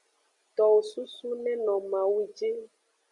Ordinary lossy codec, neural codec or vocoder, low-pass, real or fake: Opus, 64 kbps; none; 10.8 kHz; real